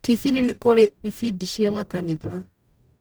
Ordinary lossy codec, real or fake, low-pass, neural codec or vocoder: none; fake; none; codec, 44.1 kHz, 0.9 kbps, DAC